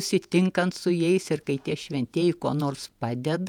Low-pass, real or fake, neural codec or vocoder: 19.8 kHz; fake; vocoder, 48 kHz, 128 mel bands, Vocos